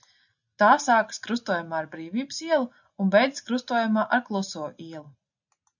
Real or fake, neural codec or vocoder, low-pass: real; none; 7.2 kHz